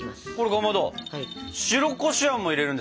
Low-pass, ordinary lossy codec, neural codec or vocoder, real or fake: none; none; none; real